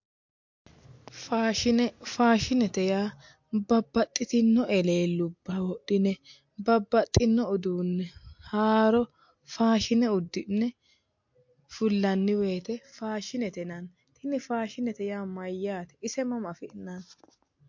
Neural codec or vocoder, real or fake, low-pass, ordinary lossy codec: none; real; 7.2 kHz; MP3, 48 kbps